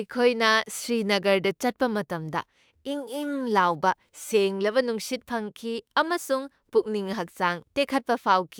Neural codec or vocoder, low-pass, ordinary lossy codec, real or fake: autoencoder, 48 kHz, 32 numbers a frame, DAC-VAE, trained on Japanese speech; none; none; fake